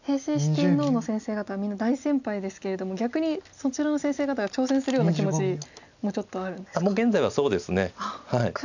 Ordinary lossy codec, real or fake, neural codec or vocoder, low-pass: none; real; none; 7.2 kHz